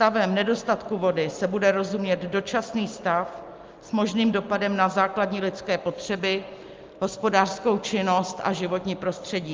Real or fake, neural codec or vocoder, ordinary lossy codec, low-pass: real; none; Opus, 24 kbps; 7.2 kHz